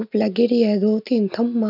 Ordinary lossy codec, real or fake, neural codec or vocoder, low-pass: none; real; none; 5.4 kHz